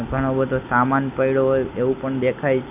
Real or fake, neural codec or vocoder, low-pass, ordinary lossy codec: real; none; 3.6 kHz; none